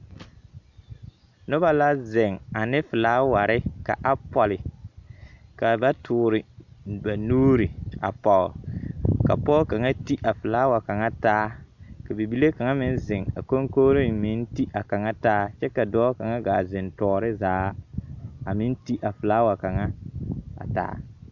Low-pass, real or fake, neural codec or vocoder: 7.2 kHz; real; none